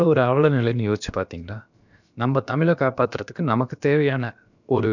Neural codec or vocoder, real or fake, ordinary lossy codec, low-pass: codec, 16 kHz, about 1 kbps, DyCAST, with the encoder's durations; fake; none; 7.2 kHz